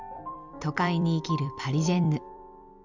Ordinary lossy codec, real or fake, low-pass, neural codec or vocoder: none; fake; 7.2 kHz; vocoder, 44.1 kHz, 128 mel bands every 256 samples, BigVGAN v2